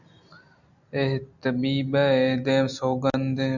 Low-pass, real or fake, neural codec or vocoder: 7.2 kHz; real; none